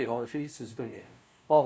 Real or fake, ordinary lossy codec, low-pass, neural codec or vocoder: fake; none; none; codec, 16 kHz, 0.5 kbps, FunCodec, trained on LibriTTS, 25 frames a second